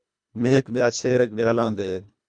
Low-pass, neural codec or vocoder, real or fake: 9.9 kHz; codec, 24 kHz, 1.5 kbps, HILCodec; fake